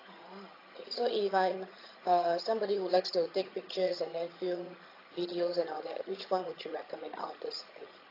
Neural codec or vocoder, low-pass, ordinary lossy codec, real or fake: vocoder, 22.05 kHz, 80 mel bands, HiFi-GAN; 5.4 kHz; AAC, 24 kbps; fake